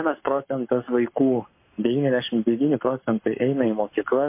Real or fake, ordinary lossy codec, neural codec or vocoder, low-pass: fake; MP3, 24 kbps; codec, 44.1 kHz, 7.8 kbps, Pupu-Codec; 3.6 kHz